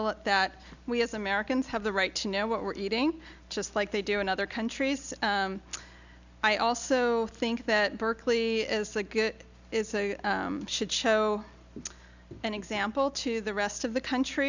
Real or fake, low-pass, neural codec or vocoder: real; 7.2 kHz; none